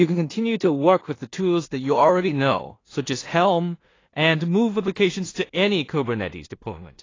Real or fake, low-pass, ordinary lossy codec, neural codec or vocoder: fake; 7.2 kHz; AAC, 32 kbps; codec, 16 kHz in and 24 kHz out, 0.4 kbps, LongCat-Audio-Codec, two codebook decoder